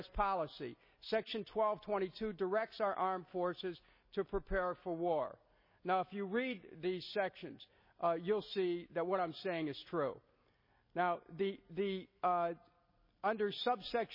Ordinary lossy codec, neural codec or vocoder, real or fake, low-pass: MP3, 24 kbps; none; real; 5.4 kHz